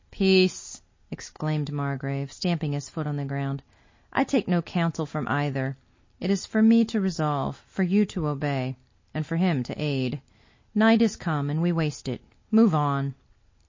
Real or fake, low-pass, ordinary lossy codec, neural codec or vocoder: real; 7.2 kHz; MP3, 32 kbps; none